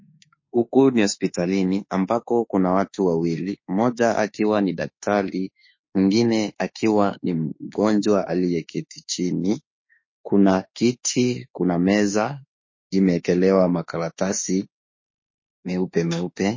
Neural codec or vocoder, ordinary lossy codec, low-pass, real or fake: autoencoder, 48 kHz, 32 numbers a frame, DAC-VAE, trained on Japanese speech; MP3, 32 kbps; 7.2 kHz; fake